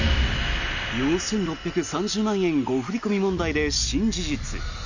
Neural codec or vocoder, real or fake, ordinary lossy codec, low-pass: none; real; none; 7.2 kHz